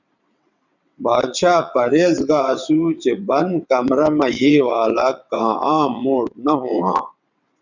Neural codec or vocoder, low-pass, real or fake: vocoder, 22.05 kHz, 80 mel bands, WaveNeXt; 7.2 kHz; fake